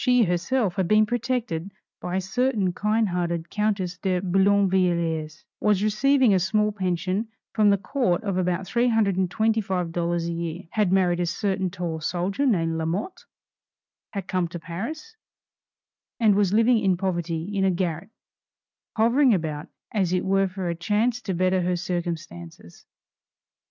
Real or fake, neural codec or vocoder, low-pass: real; none; 7.2 kHz